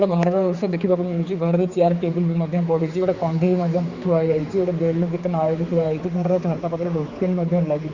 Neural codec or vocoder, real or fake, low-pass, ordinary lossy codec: codec, 16 kHz, 4 kbps, X-Codec, HuBERT features, trained on general audio; fake; 7.2 kHz; Opus, 64 kbps